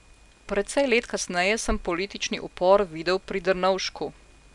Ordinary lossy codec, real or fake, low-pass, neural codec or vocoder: none; real; 10.8 kHz; none